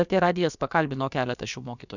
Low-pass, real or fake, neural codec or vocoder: 7.2 kHz; fake; codec, 16 kHz, about 1 kbps, DyCAST, with the encoder's durations